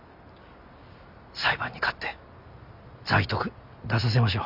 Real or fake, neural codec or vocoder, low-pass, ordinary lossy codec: real; none; 5.4 kHz; none